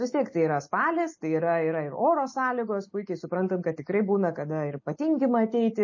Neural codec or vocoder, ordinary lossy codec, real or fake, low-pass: none; MP3, 32 kbps; real; 7.2 kHz